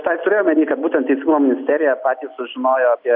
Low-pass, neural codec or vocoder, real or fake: 5.4 kHz; none; real